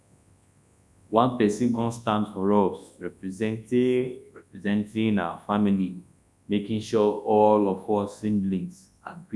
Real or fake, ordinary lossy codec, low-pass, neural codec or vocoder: fake; none; none; codec, 24 kHz, 0.9 kbps, WavTokenizer, large speech release